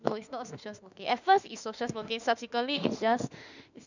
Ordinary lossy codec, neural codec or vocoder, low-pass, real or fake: none; autoencoder, 48 kHz, 32 numbers a frame, DAC-VAE, trained on Japanese speech; 7.2 kHz; fake